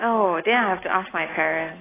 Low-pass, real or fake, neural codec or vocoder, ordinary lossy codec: 3.6 kHz; real; none; AAC, 16 kbps